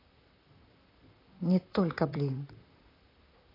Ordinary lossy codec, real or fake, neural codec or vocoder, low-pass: AAC, 24 kbps; fake; vocoder, 44.1 kHz, 128 mel bands, Pupu-Vocoder; 5.4 kHz